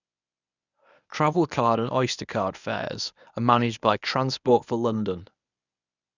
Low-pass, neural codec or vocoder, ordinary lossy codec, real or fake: 7.2 kHz; codec, 24 kHz, 0.9 kbps, WavTokenizer, medium speech release version 1; none; fake